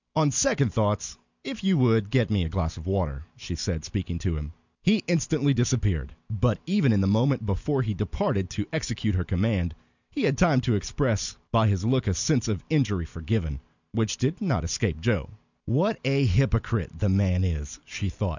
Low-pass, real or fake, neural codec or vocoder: 7.2 kHz; real; none